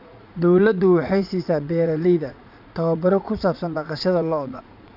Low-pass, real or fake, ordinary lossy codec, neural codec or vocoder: 5.4 kHz; fake; none; vocoder, 44.1 kHz, 80 mel bands, Vocos